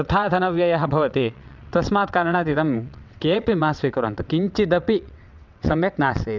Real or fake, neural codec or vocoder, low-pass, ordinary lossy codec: fake; vocoder, 22.05 kHz, 80 mel bands, WaveNeXt; 7.2 kHz; none